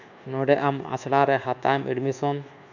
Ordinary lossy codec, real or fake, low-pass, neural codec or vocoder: none; fake; 7.2 kHz; codec, 24 kHz, 1.2 kbps, DualCodec